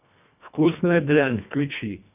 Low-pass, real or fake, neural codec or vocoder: 3.6 kHz; fake; codec, 24 kHz, 1.5 kbps, HILCodec